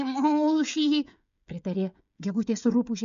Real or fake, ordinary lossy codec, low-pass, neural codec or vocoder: fake; MP3, 96 kbps; 7.2 kHz; codec, 16 kHz, 16 kbps, FreqCodec, smaller model